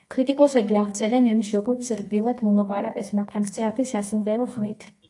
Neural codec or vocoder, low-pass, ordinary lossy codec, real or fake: codec, 24 kHz, 0.9 kbps, WavTokenizer, medium music audio release; 10.8 kHz; AAC, 64 kbps; fake